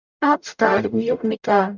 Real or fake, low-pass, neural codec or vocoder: fake; 7.2 kHz; codec, 44.1 kHz, 0.9 kbps, DAC